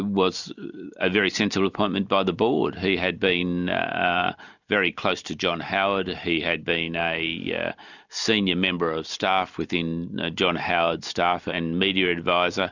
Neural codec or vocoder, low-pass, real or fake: none; 7.2 kHz; real